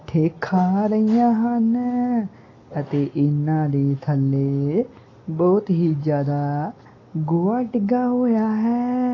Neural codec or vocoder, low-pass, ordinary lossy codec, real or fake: none; 7.2 kHz; AAC, 32 kbps; real